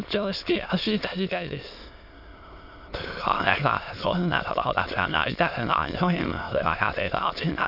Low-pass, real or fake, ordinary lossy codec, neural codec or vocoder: 5.4 kHz; fake; none; autoencoder, 22.05 kHz, a latent of 192 numbers a frame, VITS, trained on many speakers